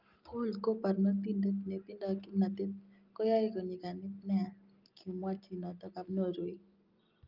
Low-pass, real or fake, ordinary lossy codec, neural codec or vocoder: 5.4 kHz; real; Opus, 24 kbps; none